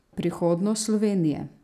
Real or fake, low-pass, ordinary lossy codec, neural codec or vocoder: real; 14.4 kHz; none; none